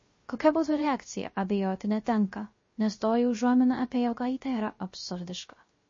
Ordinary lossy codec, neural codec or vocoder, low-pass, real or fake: MP3, 32 kbps; codec, 16 kHz, 0.3 kbps, FocalCodec; 7.2 kHz; fake